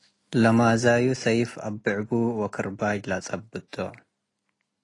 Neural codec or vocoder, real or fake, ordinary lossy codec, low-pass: none; real; AAC, 32 kbps; 10.8 kHz